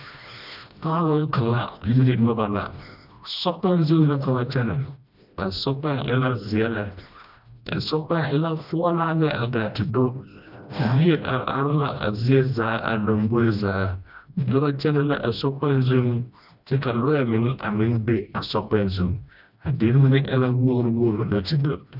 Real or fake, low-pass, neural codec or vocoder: fake; 5.4 kHz; codec, 16 kHz, 1 kbps, FreqCodec, smaller model